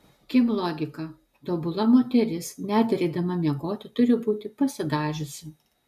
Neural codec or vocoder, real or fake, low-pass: none; real; 14.4 kHz